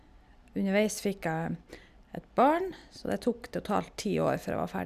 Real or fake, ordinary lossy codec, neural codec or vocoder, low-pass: real; none; none; 14.4 kHz